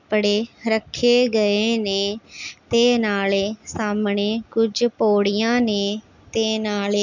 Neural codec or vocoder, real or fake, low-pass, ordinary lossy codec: none; real; 7.2 kHz; none